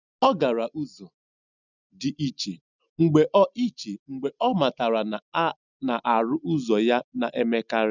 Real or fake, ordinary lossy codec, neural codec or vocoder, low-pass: real; none; none; 7.2 kHz